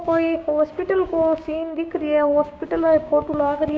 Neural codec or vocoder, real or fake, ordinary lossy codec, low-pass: codec, 16 kHz, 6 kbps, DAC; fake; none; none